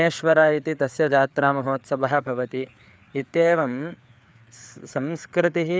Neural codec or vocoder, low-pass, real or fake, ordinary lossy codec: codec, 16 kHz, 4 kbps, FreqCodec, larger model; none; fake; none